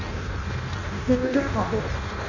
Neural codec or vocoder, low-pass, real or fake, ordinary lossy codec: codec, 16 kHz in and 24 kHz out, 0.6 kbps, FireRedTTS-2 codec; 7.2 kHz; fake; none